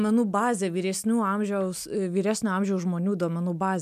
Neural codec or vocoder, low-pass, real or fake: none; 14.4 kHz; real